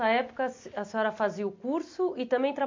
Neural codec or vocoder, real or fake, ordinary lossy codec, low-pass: none; real; MP3, 48 kbps; 7.2 kHz